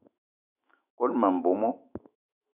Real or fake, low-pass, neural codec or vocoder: fake; 3.6 kHz; autoencoder, 48 kHz, 128 numbers a frame, DAC-VAE, trained on Japanese speech